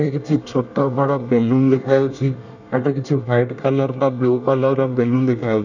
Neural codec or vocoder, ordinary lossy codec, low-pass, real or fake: codec, 24 kHz, 1 kbps, SNAC; none; 7.2 kHz; fake